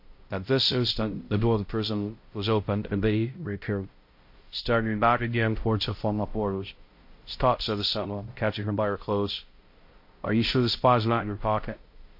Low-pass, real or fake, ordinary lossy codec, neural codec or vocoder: 5.4 kHz; fake; MP3, 32 kbps; codec, 16 kHz, 0.5 kbps, X-Codec, HuBERT features, trained on balanced general audio